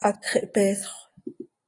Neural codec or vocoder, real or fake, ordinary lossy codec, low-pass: none; real; AAC, 32 kbps; 10.8 kHz